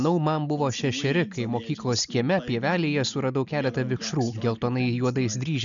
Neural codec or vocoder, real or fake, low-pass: none; real; 7.2 kHz